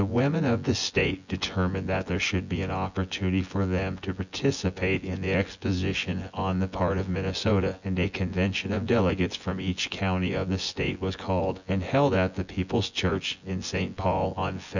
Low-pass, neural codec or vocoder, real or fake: 7.2 kHz; vocoder, 24 kHz, 100 mel bands, Vocos; fake